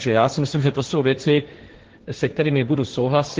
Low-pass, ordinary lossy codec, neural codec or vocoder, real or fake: 7.2 kHz; Opus, 16 kbps; codec, 16 kHz, 1.1 kbps, Voila-Tokenizer; fake